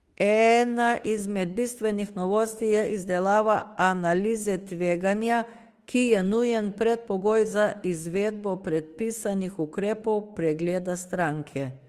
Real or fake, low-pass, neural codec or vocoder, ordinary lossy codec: fake; 14.4 kHz; autoencoder, 48 kHz, 32 numbers a frame, DAC-VAE, trained on Japanese speech; Opus, 24 kbps